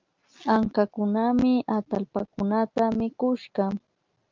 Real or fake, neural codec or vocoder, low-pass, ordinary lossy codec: real; none; 7.2 kHz; Opus, 24 kbps